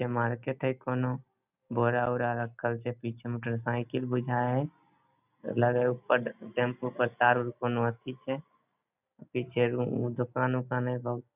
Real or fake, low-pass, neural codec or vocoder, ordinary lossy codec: real; 3.6 kHz; none; none